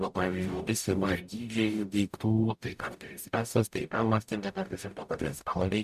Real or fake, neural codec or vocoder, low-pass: fake; codec, 44.1 kHz, 0.9 kbps, DAC; 14.4 kHz